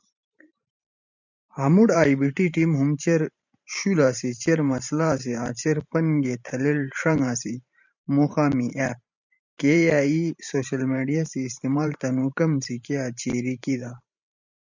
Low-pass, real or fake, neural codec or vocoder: 7.2 kHz; fake; vocoder, 44.1 kHz, 128 mel bands every 512 samples, BigVGAN v2